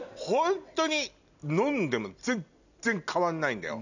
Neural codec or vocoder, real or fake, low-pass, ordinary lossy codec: none; real; 7.2 kHz; none